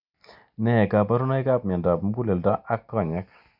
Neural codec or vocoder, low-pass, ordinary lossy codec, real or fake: none; 5.4 kHz; none; real